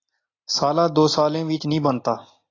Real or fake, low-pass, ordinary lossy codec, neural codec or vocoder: real; 7.2 kHz; AAC, 32 kbps; none